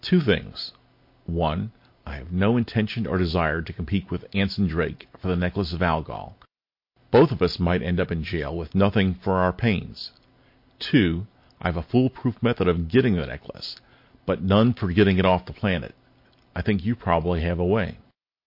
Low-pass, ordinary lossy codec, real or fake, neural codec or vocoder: 5.4 kHz; MP3, 32 kbps; real; none